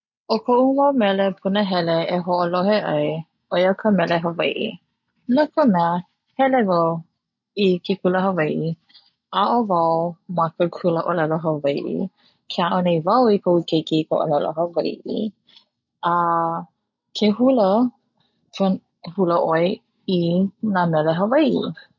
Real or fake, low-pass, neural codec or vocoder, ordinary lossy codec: real; 7.2 kHz; none; none